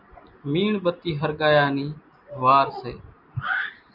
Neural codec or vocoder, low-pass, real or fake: none; 5.4 kHz; real